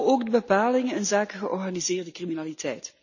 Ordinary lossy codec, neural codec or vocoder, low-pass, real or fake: none; none; 7.2 kHz; real